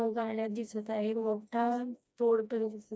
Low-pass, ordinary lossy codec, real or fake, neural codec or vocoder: none; none; fake; codec, 16 kHz, 1 kbps, FreqCodec, smaller model